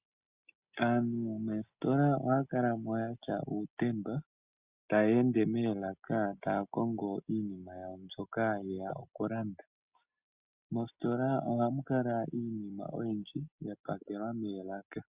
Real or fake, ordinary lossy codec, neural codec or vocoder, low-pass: real; Opus, 64 kbps; none; 3.6 kHz